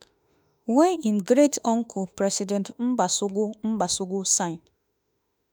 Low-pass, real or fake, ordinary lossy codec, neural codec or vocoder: none; fake; none; autoencoder, 48 kHz, 32 numbers a frame, DAC-VAE, trained on Japanese speech